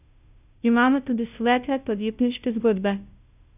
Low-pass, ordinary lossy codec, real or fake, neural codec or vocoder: 3.6 kHz; none; fake; codec, 16 kHz, 0.5 kbps, FunCodec, trained on Chinese and English, 25 frames a second